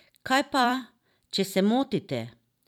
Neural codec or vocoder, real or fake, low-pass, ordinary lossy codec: vocoder, 44.1 kHz, 128 mel bands every 256 samples, BigVGAN v2; fake; 19.8 kHz; none